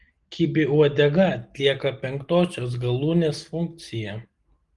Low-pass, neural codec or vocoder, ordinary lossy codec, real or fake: 10.8 kHz; none; Opus, 24 kbps; real